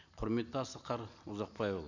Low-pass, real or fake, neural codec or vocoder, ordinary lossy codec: 7.2 kHz; real; none; none